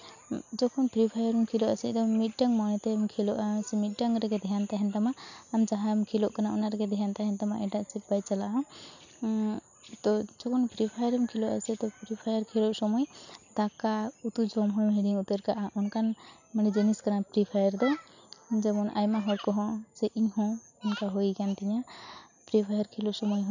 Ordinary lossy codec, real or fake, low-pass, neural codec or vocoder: none; real; 7.2 kHz; none